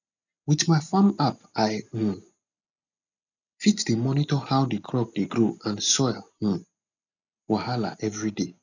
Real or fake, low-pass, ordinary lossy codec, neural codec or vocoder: real; 7.2 kHz; none; none